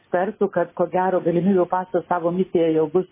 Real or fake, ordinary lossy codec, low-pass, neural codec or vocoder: real; MP3, 16 kbps; 3.6 kHz; none